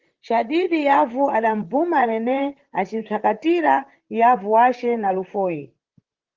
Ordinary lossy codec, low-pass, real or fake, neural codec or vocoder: Opus, 16 kbps; 7.2 kHz; fake; vocoder, 44.1 kHz, 128 mel bands, Pupu-Vocoder